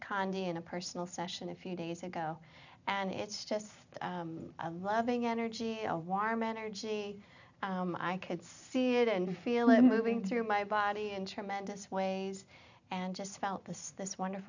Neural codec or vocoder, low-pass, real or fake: none; 7.2 kHz; real